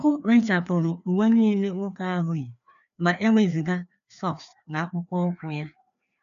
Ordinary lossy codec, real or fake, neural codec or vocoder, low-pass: none; fake; codec, 16 kHz, 2 kbps, FreqCodec, larger model; 7.2 kHz